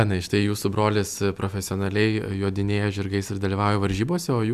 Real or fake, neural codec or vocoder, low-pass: real; none; 14.4 kHz